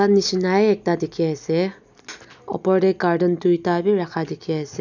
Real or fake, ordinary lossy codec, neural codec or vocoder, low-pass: real; none; none; 7.2 kHz